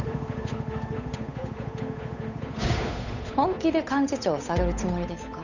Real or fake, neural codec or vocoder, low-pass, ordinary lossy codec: fake; codec, 16 kHz, 8 kbps, FunCodec, trained on Chinese and English, 25 frames a second; 7.2 kHz; none